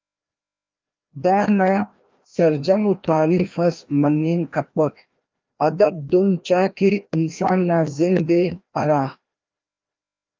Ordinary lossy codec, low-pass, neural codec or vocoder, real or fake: Opus, 24 kbps; 7.2 kHz; codec, 16 kHz, 1 kbps, FreqCodec, larger model; fake